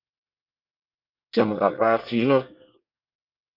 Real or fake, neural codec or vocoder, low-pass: fake; codec, 24 kHz, 1 kbps, SNAC; 5.4 kHz